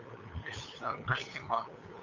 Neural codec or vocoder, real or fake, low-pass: codec, 16 kHz, 8 kbps, FunCodec, trained on LibriTTS, 25 frames a second; fake; 7.2 kHz